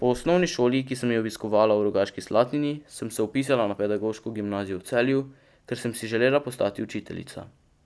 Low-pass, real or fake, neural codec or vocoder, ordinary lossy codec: none; real; none; none